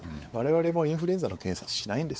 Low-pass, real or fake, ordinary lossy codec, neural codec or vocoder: none; fake; none; codec, 16 kHz, 4 kbps, X-Codec, WavLM features, trained on Multilingual LibriSpeech